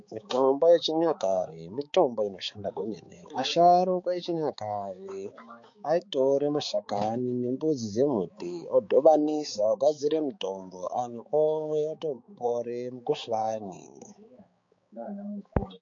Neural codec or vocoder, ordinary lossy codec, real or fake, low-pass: codec, 16 kHz, 4 kbps, X-Codec, HuBERT features, trained on balanced general audio; MP3, 48 kbps; fake; 7.2 kHz